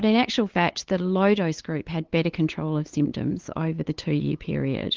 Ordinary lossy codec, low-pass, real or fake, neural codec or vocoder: Opus, 16 kbps; 7.2 kHz; fake; codec, 16 kHz, 8 kbps, FunCodec, trained on LibriTTS, 25 frames a second